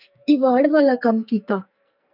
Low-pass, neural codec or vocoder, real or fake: 5.4 kHz; codec, 44.1 kHz, 2.6 kbps, SNAC; fake